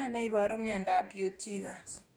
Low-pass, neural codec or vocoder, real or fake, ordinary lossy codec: none; codec, 44.1 kHz, 2.6 kbps, DAC; fake; none